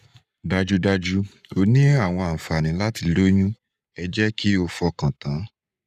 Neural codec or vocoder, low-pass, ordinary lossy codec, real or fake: codec, 44.1 kHz, 7.8 kbps, Pupu-Codec; 14.4 kHz; none; fake